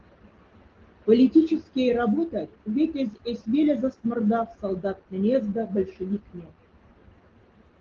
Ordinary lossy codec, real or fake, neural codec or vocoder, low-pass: Opus, 16 kbps; real; none; 7.2 kHz